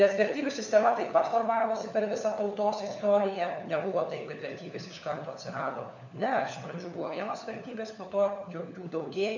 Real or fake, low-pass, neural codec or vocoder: fake; 7.2 kHz; codec, 16 kHz, 4 kbps, FunCodec, trained on LibriTTS, 50 frames a second